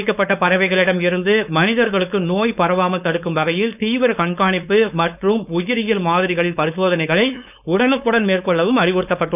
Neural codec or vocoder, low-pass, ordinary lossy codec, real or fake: codec, 16 kHz, 4.8 kbps, FACodec; 3.6 kHz; none; fake